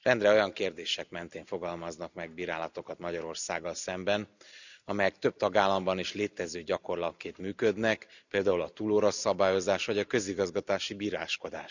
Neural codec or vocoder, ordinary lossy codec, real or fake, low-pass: none; none; real; 7.2 kHz